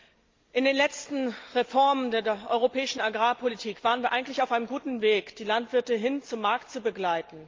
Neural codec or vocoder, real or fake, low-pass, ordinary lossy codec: none; real; 7.2 kHz; Opus, 32 kbps